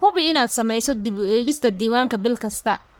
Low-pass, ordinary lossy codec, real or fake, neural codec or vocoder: none; none; fake; codec, 44.1 kHz, 1.7 kbps, Pupu-Codec